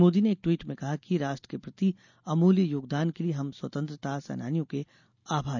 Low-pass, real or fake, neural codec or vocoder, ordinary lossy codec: 7.2 kHz; real; none; none